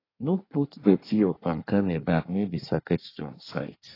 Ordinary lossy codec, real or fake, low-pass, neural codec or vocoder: AAC, 24 kbps; fake; 5.4 kHz; codec, 24 kHz, 1 kbps, SNAC